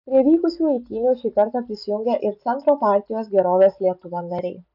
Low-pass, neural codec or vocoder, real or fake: 5.4 kHz; none; real